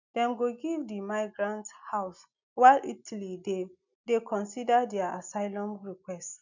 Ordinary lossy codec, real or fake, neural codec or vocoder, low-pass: none; real; none; 7.2 kHz